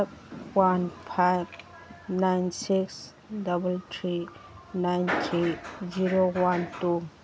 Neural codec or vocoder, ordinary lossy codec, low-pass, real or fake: none; none; none; real